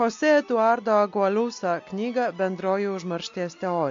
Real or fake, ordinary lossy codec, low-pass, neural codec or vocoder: real; MP3, 48 kbps; 7.2 kHz; none